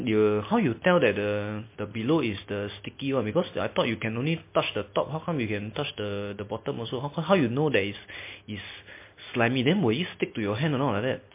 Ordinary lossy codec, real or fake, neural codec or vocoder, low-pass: MP3, 24 kbps; real; none; 3.6 kHz